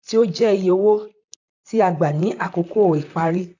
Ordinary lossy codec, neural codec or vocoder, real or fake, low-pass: AAC, 48 kbps; vocoder, 44.1 kHz, 128 mel bands, Pupu-Vocoder; fake; 7.2 kHz